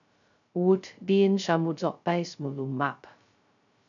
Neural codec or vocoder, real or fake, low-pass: codec, 16 kHz, 0.2 kbps, FocalCodec; fake; 7.2 kHz